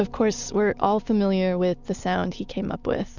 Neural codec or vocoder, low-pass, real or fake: none; 7.2 kHz; real